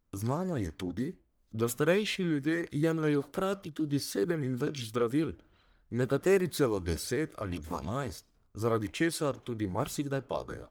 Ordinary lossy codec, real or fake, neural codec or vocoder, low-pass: none; fake; codec, 44.1 kHz, 1.7 kbps, Pupu-Codec; none